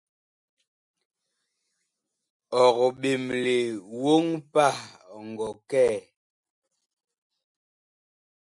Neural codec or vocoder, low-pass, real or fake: none; 10.8 kHz; real